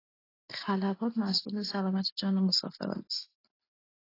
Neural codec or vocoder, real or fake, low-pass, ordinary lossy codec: none; real; 5.4 kHz; AAC, 24 kbps